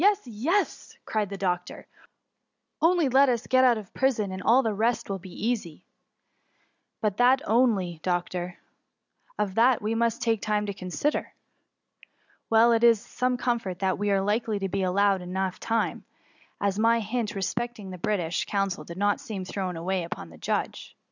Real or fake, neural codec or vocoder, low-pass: real; none; 7.2 kHz